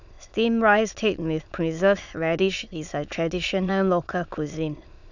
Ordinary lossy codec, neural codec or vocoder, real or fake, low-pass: none; autoencoder, 22.05 kHz, a latent of 192 numbers a frame, VITS, trained on many speakers; fake; 7.2 kHz